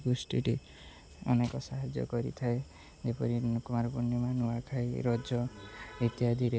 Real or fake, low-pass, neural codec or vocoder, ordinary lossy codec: real; none; none; none